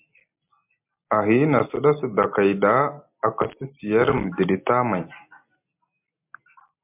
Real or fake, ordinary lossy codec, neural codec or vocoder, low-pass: real; MP3, 32 kbps; none; 3.6 kHz